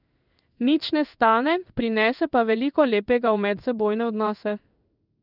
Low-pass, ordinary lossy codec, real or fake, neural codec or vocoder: 5.4 kHz; none; fake; codec, 16 kHz in and 24 kHz out, 1 kbps, XY-Tokenizer